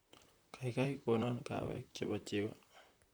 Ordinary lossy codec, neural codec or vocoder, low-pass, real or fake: none; vocoder, 44.1 kHz, 128 mel bands, Pupu-Vocoder; none; fake